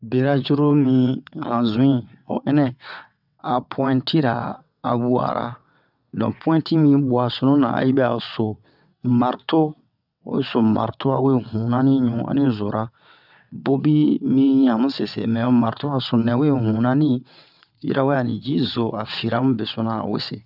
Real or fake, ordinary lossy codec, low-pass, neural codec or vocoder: fake; none; 5.4 kHz; vocoder, 22.05 kHz, 80 mel bands, WaveNeXt